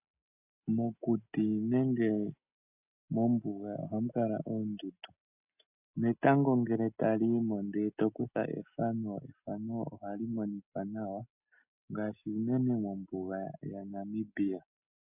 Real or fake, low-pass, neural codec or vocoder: real; 3.6 kHz; none